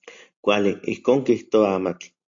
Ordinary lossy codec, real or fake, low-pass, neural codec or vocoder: AAC, 48 kbps; real; 7.2 kHz; none